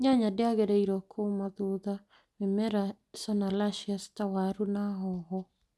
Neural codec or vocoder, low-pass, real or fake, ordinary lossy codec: none; none; real; none